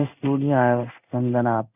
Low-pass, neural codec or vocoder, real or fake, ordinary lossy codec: 3.6 kHz; codec, 16 kHz in and 24 kHz out, 1 kbps, XY-Tokenizer; fake; none